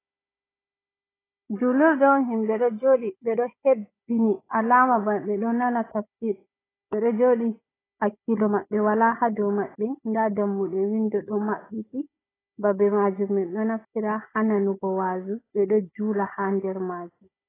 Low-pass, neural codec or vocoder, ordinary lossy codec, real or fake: 3.6 kHz; codec, 16 kHz, 16 kbps, FunCodec, trained on Chinese and English, 50 frames a second; AAC, 16 kbps; fake